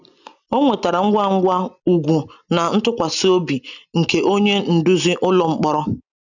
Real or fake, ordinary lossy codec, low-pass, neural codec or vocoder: real; none; 7.2 kHz; none